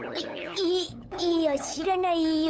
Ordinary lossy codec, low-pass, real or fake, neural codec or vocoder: none; none; fake; codec, 16 kHz, 8 kbps, FunCodec, trained on LibriTTS, 25 frames a second